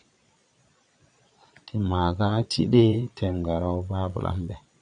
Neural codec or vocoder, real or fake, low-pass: vocoder, 22.05 kHz, 80 mel bands, Vocos; fake; 9.9 kHz